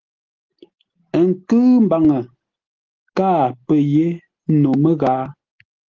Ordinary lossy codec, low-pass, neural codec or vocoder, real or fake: Opus, 16 kbps; 7.2 kHz; none; real